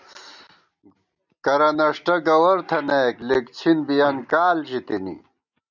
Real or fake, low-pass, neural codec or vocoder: real; 7.2 kHz; none